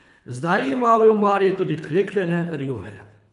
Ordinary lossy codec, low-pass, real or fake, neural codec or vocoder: none; 10.8 kHz; fake; codec, 24 kHz, 3 kbps, HILCodec